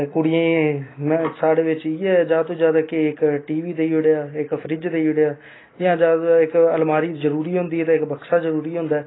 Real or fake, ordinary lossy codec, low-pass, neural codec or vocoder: real; AAC, 16 kbps; 7.2 kHz; none